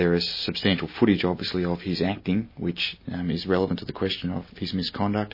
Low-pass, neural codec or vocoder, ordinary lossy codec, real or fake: 5.4 kHz; none; MP3, 24 kbps; real